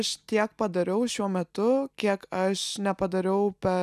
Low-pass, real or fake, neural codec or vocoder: 14.4 kHz; real; none